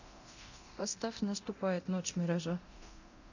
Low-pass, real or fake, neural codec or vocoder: 7.2 kHz; fake; codec, 24 kHz, 0.9 kbps, DualCodec